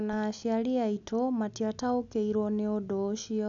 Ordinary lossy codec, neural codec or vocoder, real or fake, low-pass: none; none; real; 7.2 kHz